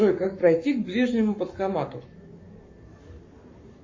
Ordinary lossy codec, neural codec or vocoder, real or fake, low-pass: MP3, 32 kbps; codec, 44.1 kHz, 7.8 kbps, Pupu-Codec; fake; 7.2 kHz